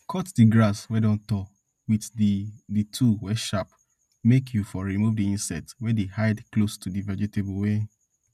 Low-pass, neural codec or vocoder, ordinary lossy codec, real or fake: 14.4 kHz; none; none; real